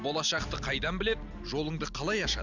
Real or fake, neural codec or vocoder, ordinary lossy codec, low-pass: real; none; none; 7.2 kHz